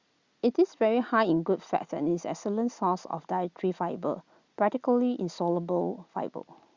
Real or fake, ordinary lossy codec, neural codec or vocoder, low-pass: real; Opus, 64 kbps; none; 7.2 kHz